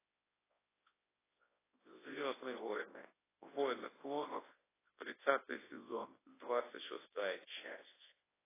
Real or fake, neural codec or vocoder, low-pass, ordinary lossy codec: fake; codec, 24 kHz, 0.9 kbps, WavTokenizer, large speech release; 3.6 kHz; AAC, 16 kbps